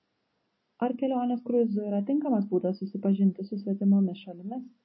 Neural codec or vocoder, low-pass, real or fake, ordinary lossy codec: none; 7.2 kHz; real; MP3, 24 kbps